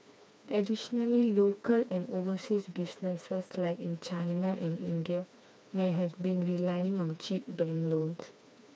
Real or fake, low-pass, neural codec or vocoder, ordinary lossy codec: fake; none; codec, 16 kHz, 2 kbps, FreqCodec, smaller model; none